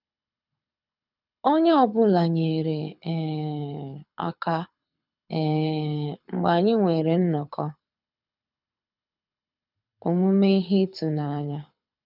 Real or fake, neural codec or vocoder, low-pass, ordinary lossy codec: fake; codec, 24 kHz, 6 kbps, HILCodec; 5.4 kHz; none